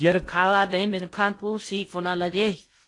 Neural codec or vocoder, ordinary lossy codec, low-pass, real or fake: codec, 16 kHz in and 24 kHz out, 0.6 kbps, FocalCodec, streaming, 2048 codes; AAC, 48 kbps; 10.8 kHz; fake